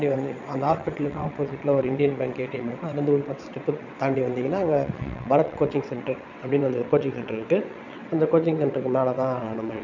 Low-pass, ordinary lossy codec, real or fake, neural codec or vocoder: 7.2 kHz; none; fake; vocoder, 22.05 kHz, 80 mel bands, WaveNeXt